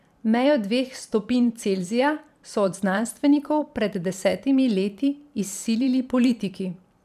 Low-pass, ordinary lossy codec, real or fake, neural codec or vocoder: 14.4 kHz; none; fake; vocoder, 44.1 kHz, 128 mel bands every 512 samples, BigVGAN v2